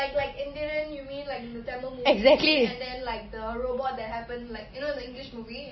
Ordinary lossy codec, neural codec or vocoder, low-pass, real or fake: MP3, 24 kbps; none; 7.2 kHz; real